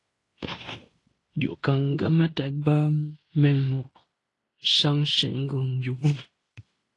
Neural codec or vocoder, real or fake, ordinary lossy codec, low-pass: codec, 16 kHz in and 24 kHz out, 0.9 kbps, LongCat-Audio-Codec, fine tuned four codebook decoder; fake; AAC, 48 kbps; 10.8 kHz